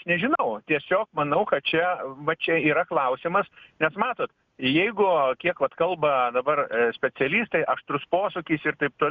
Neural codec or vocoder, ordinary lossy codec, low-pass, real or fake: none; Opus, 64 kbps; 7.2 kHz; real